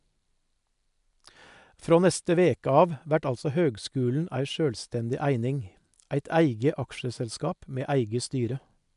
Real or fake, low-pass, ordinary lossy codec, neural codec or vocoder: real; 10.8 kHz; MP3, 96 kbps; none